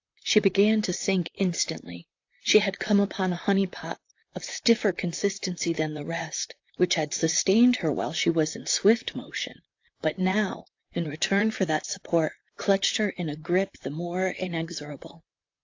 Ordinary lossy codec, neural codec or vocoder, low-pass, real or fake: AAC, 48 kbps; vocoder, 44.1 kHz, 128 mel bands, Pupu-Vocoder; 7.2 kHz; fake